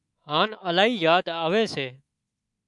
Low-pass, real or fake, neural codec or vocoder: 10.8 kHz; fake; codec, 24 kHz, 3.1 kbps, DualCodec